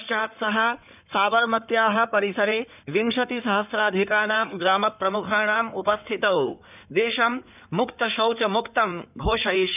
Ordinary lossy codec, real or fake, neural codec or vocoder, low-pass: none; fake; codec, 16 kHz in and 24 kHz out, 2.2 kbps, FireRedTTS-2 codec; 3.6 kHz